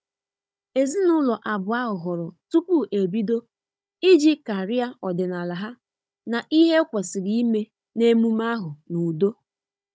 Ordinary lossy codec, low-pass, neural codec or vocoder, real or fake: none; none; codec, 16 kHz, 16 kbps, FunCodec, trained on Chinese and English, 50 frames a second; fake